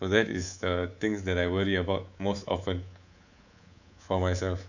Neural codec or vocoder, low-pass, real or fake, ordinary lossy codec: codec, 24 kHz, 3.1 kbps, DualCodec; 7.2 kHz; fake; none